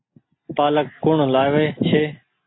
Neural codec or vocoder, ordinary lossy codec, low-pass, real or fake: none; AAC, 16 kbps; 7.2 kHz; real